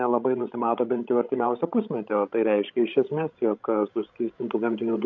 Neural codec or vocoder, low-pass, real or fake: codec, 16 kHz, 16 kbps, FreqCodec, larger model; 7.2 kHz; fake